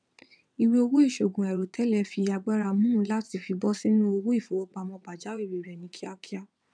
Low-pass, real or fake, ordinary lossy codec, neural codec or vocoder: 9.9 kHz; fake; none; vocoder, 22.05 kHz, 80 mel bands, WaveNeXt